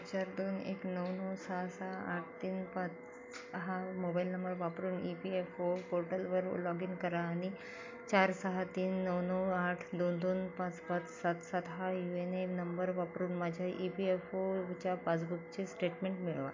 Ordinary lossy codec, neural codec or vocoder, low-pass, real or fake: MP3, 48 kbps; none; 7.2 kHz; real